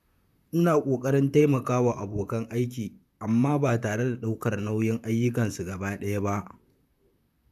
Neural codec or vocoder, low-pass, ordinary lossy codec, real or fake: vocoder, 44.1 kHz, 128 mel bands, Pupu-Vocoder; 14.4 kHz; none; fake